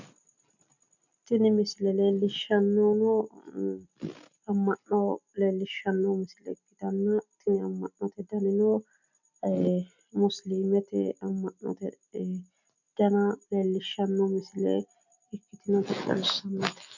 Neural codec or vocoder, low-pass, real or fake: none; 7.2 kHz; real